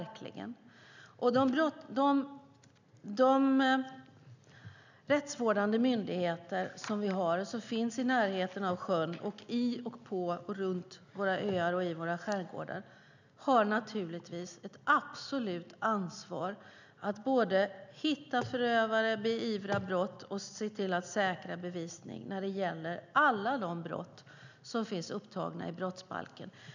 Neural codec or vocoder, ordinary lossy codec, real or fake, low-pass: none; none; real; 7.2 kHz